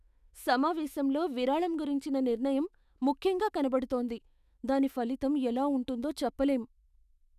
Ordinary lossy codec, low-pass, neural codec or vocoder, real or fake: none; 14.4 kHz; autoencoder, 48 kHz, 128 numbers a frame, DAC-VAE, trained on Japanese speech; fake